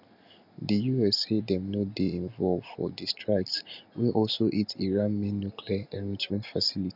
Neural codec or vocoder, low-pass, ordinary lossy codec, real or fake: none; 5.4 kHz; none; real